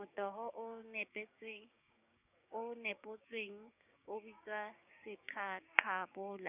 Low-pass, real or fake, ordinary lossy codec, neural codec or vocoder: 3.6 kHz; fake; none; codec, 16 kHz, 6 kbps, DAC